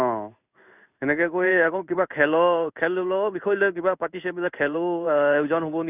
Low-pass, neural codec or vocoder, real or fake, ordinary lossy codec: 3.6 kHz; codec, 16 kHz in and 24 kHz out, 1 kbps, XY-Tokenizer; fake; none